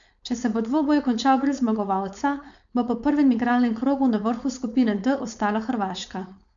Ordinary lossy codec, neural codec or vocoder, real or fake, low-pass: none; codec, 16 kHz, 4.8 kbps, FACodec; fake; 7.2 kHz